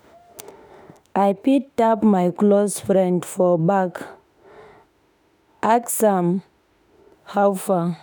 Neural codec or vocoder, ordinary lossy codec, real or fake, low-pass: autoencoder, 48 kHz, 32 numbers a frame, DAC-VAE, trained on Japanese speech; none; fake; none